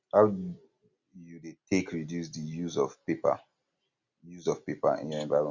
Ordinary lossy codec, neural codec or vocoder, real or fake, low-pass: Opus, 64 kbps; none; real; 7.2 kHz